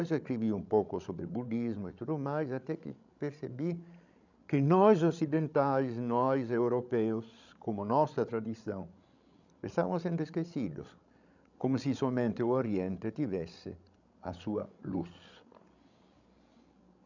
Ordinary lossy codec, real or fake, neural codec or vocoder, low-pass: none; fake; codec, 16 kHz, 16 kbps, FreqCodec, larger model; 7.2 kHz